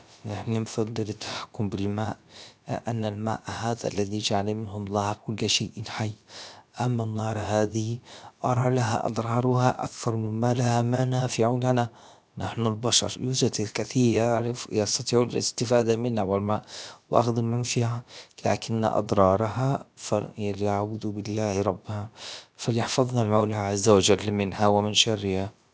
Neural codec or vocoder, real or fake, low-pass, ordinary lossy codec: codec, 16 kHz, about 1 kbps, DyCAST, with the encoder's durations; fake; none; none